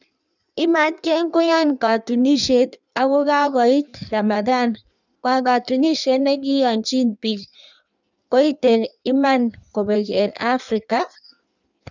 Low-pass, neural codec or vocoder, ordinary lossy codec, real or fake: 7.2 kHz; codec, 16 kHz in and 24 kHz out, 1.1 kbps, FireRedTTS-2 codec; none; fake